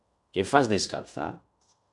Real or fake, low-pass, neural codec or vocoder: fake; 10.8 kHz; codec, 16 kHz in and 24 kHz out, 0.9 kbps, LongCat-Audio-Codec, fine tuned four codebook decoder